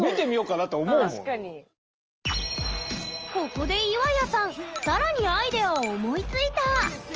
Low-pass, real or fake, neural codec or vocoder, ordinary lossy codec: 7.2 kHz; real; none; Opus, 24 kbps